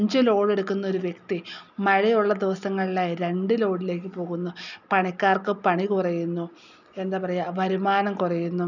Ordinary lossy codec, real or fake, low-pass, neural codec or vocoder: none; real; 7.2 kHz; none